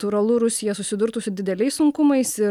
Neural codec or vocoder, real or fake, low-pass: none; real; 19.8 kHz